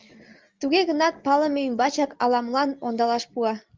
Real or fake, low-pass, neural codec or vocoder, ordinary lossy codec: real; 7.2 kHz; none; Opus, 32 kbps